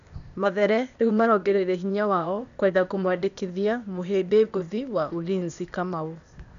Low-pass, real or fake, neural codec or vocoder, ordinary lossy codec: 7.2 kHz; fake; codec, 16 kHz, 0.8 kbps, ZipCodec; none